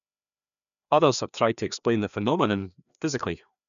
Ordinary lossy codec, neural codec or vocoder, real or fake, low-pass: none; codec, 16 kHz, 2 kbps, FreqCodec, larger model; fake; 7.2 kHz